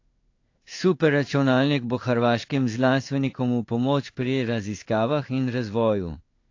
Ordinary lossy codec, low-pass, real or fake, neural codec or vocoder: AAC, 48 kbps; 7.2 kHz; fake; codec, 16 kHz in and 24 kHz out, 1 kbps, XY-Tokenizer